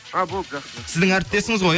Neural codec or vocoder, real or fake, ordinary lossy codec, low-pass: none; real; none; none